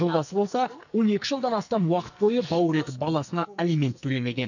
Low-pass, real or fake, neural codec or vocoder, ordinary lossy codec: 7.2 kHz; fake; codec, 44.1 kHz, 2.6 kbps, SNAC; none